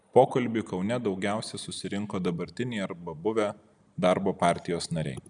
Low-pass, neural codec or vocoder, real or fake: 9.9 kHz; none; real